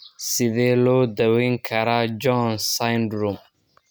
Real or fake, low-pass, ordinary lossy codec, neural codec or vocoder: real; none; none; none